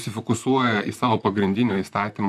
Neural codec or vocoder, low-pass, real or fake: vocoder, 44.1 kHz, 128 mel bands, Pupu-Vocoder; 14.4 kHz; fake